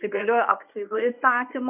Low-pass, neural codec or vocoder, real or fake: 3.6 kHz; codec, 24 kHz, 0.9 kbps, WavTokenizer, medium speech release version 1; fake